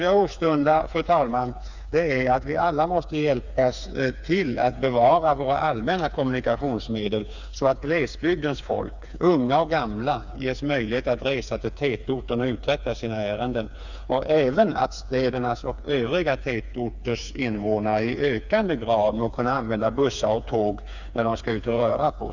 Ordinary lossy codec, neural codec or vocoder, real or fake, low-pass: none; codec, 16 kHz, 4 kbps, FreqCodec, smaller model; fake; 7.2 kHz